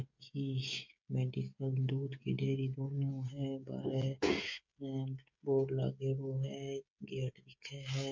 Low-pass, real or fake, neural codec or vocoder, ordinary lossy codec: 7.2 kHz; fake; codec, 16 kHz, 16 kbps, FreqCodec, smaller model; MP3, 48 kbps